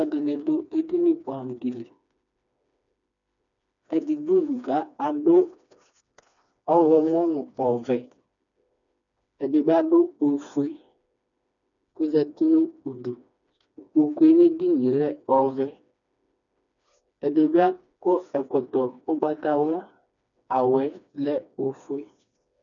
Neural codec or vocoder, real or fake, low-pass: codec, 16 kHz, 2 kbps, FreqCodec, smaller model; fake; 7.2 kHz